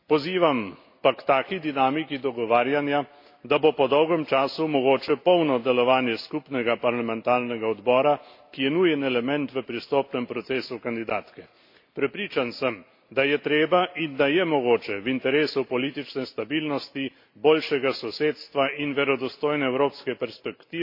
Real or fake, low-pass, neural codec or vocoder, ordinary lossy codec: real; 5.4 kHz; none; MP3, 32 kbps